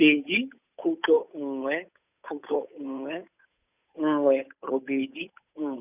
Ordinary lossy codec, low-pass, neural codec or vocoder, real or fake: none; 3.6 kHz; codec, 16 kHz, 8 kbps, FunCodec, trained on Chinese and English, 25 frames a second; fake